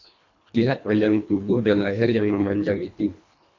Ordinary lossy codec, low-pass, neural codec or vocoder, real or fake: AAC, 48 kbps; 7.2 kHz; codec, 24 kHz, 1.5 kbps, HILCodec; fake